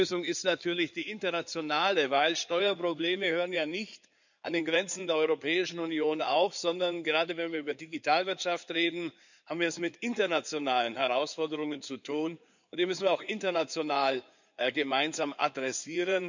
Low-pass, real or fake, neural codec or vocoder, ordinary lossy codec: 7.2 kHz; fake; codec, 16 kHz in and 24 kHz out, 2.2 kbps, FireRedTTS-2 codec; none